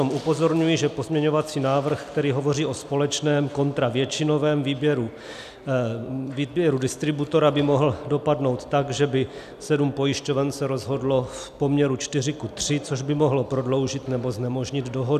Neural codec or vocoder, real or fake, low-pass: none; real; 14.4 kHz